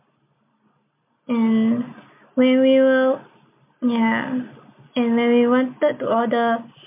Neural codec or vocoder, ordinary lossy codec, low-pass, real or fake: none; MP3, 32 kbps; 3.6 kHz; real